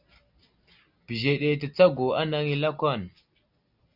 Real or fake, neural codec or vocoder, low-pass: real; none; 5.4 kHz